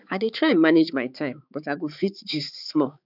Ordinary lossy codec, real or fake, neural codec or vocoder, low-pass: none; fake; codec, 16 kHz, 4 kbps, X-Codec, HuBERT features, trained on balanced general audio; 5.4 kHz